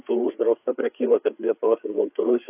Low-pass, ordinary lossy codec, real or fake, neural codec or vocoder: 3.6 kHz; AAC, 32 kbps; fake; codec, 16 kHz, 4 kbps, FreqCodec, larger model